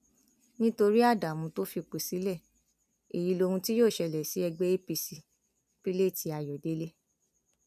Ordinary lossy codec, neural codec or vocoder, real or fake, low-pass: none; none; real; 14.4 kHz